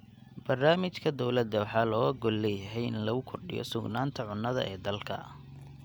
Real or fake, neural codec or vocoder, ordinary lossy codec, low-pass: real; none; none; none